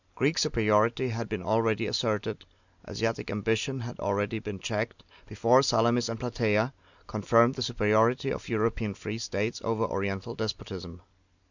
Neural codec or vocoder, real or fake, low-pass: none; real; 7.2 kHz